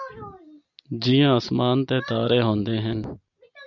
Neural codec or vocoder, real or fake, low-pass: none; real; 7.2 kHz